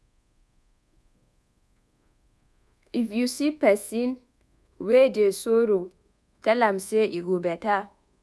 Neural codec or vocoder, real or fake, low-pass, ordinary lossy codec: codec, 24 kHz, 1.2 kbps, DualCodec; fake; none; none